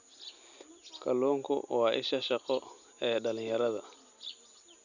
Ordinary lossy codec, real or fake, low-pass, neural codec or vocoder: none; real; 7.2 kHz; none